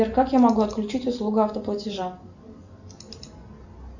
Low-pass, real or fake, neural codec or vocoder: 7.2 kHz; real; none